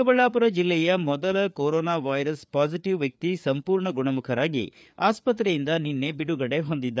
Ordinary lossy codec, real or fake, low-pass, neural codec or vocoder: none; fake; none; codec, 16 kHz, 4 kbps, FreqCodec, larger model